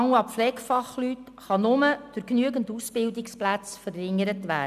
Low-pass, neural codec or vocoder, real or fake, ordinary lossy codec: 14.4 kHz; none; real; none